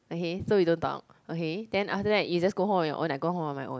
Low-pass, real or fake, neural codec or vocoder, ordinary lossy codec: none; real; none; none